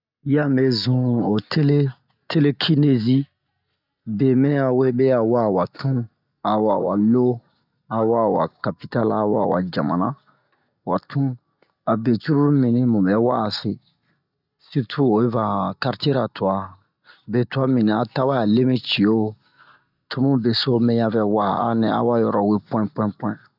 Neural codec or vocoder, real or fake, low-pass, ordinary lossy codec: none; real; 5.4 kHz; none